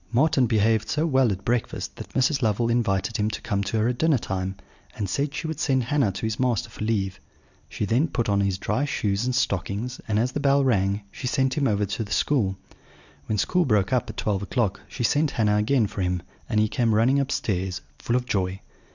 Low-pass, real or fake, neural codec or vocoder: 7.2 kHz; real; none